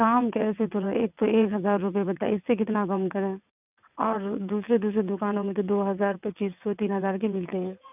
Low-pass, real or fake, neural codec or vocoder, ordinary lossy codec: 3.6 kHz; fake; vocoder, 22.05 kHz, 80 mel bands, WaveNeXt; none